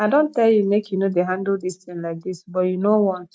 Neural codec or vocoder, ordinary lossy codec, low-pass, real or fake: none; none; none; real